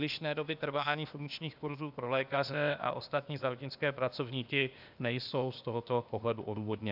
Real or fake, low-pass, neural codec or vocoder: fake; 5.4 kHz; codec, 16 kHz, 0.8 kbps, ZipCodec